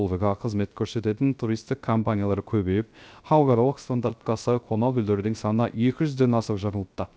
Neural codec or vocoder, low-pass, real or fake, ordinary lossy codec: codec, 16 kHz, 0.3 kbps, FocalCodec; none; fake; none